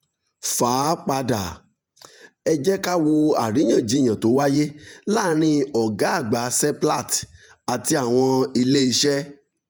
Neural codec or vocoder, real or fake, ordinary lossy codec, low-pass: vocoder, 48 kHz, 128 mel bands, Vocos; fake; none; none